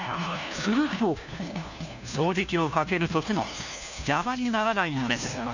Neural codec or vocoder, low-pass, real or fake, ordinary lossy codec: codec, 16 kHz, 1 kbps, FunCodec, trained on LibriTTS, 50 frames a second; 7.2 kHz; fake; none